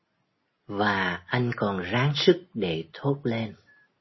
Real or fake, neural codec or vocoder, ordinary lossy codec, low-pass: real; none; MP3, 24 kbps; 7.2 kHz